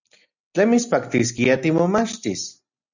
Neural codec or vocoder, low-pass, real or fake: none; 7.2 kHz; real